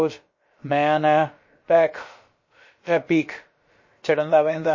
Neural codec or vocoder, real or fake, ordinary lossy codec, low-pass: codec, 16 kHz, about 1 kbps, DyCAST, with the encoder's durations; fake; MP3, 32 kbps; 7.2 kHz